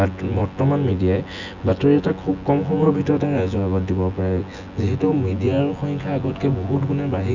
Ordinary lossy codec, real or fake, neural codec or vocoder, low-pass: none; fake; vocoder, 24 kHz, 100 mel bands, Vocos; 7.2 kHz